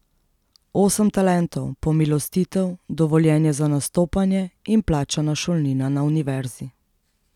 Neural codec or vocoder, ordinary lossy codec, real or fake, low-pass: none; none; real; 19.8 kHz